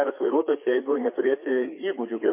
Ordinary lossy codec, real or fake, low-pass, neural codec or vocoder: MP3, 24 kbps; fake; 3.6 kHz; codec, 16 kHz, 4 kbps, FreqCodec, larger model